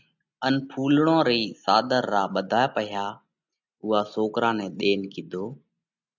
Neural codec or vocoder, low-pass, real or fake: none; 7.2 kHz; real